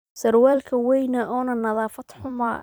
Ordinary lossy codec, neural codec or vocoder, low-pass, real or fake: none; vocoder, 44.1 kHz, 128 mel bands every 256 samples, BigVGAN v2; none; fake